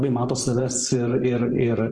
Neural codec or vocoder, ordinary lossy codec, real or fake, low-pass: vocoder, 24 kHz, 100 mel bands, Vocos; Opus, 16 kbps; fake; 10.8 kHz